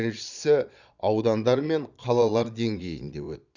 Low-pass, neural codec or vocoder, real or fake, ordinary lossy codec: 7.2 kHz; vocoder, 22.05 kHz, 80 mel bands, Vocos; fake; none